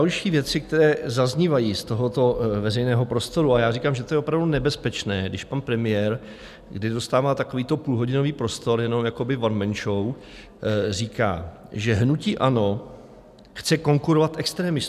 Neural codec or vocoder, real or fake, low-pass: none; real; 14.4 kHz